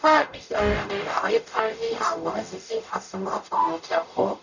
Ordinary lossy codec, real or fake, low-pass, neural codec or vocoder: none; fake; 7.2 kHz; codec, 44.1 kHz, 0.9 kbps, DAC